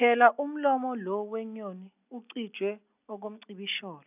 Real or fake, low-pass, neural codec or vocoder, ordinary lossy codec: real; 3.6 kHz; none; none